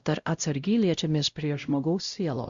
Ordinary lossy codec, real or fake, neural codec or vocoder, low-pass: Opus, 64 kbps; fake; codec, 16 kHz, 0.5 kbps, X-Codec, WavLM features, trained on Multilingual LibriSpeech; 7.2 kHz